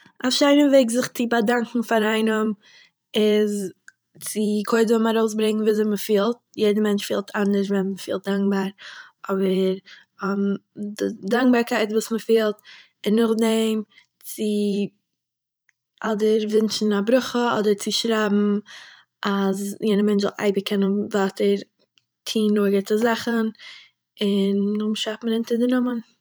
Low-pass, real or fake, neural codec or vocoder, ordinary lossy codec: none; fake; vocoder, 44.1 kHz, 128 mel bands every 512 samples, BigVGAN v2; none